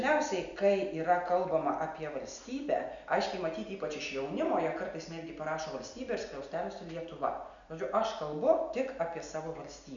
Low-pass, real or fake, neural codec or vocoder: 7.2 kHz; real; none